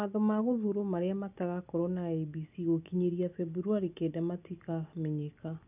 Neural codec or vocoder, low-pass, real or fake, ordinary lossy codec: none; 3.6 kHz; real; none